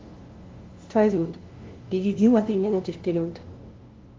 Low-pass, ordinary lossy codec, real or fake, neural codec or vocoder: 7.2 kHz; Opus, 16 kbps; fake; codec, 16 kHz, 0.5 kbps, FunCodec, trained on LibriTTS, 25 frames a second